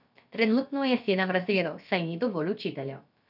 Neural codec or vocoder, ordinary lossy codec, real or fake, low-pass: codec, 16 kHz, about 1 kbps, DyCAST, with the encoder's durations; none; fake; 5.4 kHz